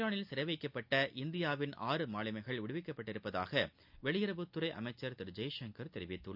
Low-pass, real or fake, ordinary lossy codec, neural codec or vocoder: 5.4 kHz; real; none; none